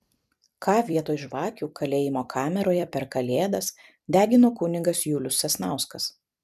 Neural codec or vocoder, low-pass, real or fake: vocoder, 44.1 kHz, 128 mel bands every 256 samples, BigVGAN v2; 14.4 kHz; fake